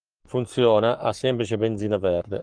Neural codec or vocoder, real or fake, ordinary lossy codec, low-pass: codec, 44.1 kHz, 7.8 kbps, Pupu-Codec; fake; Opus, 24 kbps; 9.9 kHz